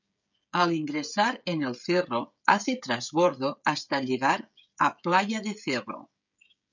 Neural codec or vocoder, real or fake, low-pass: codec, 16 kHz, 16 kbps, FreqCodec, smaller model; fake; 7.2 kHz